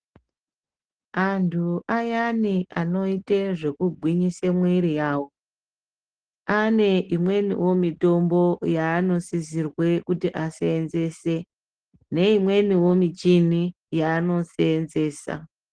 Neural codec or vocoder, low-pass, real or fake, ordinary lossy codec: none; 9.9 kHz; real; Opus, 16 kbps